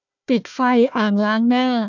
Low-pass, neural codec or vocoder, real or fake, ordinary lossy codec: 7.2 kHz; codec, 16 kHz, 1 kbps, FunCodec, trained on Chinese and English, 50 frames a second; fake; none